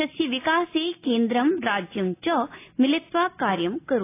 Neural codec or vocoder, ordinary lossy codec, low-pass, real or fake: none; AAC, 24 kbps; 3.6 kHz; real